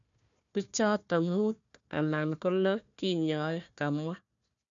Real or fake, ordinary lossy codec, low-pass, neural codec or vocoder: fake; none; 7.2 kHz; codec, 16 kHz, 1 kbps, FunCodec, trained on Chinese and English, 50 frames a second